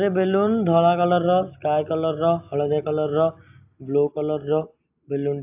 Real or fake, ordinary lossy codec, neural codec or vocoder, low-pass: real; AAC, 32 kbps; none; 3.6 kHz